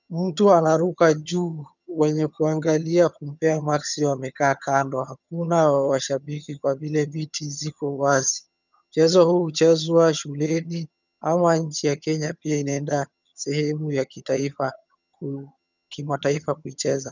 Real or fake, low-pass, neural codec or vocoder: fake; 7.2 kHz; vocoder, 22.05 kHz, 80 mel bands, HiFi-GAN